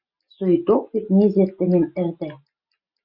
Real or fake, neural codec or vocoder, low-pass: real; none; 5.4 kHz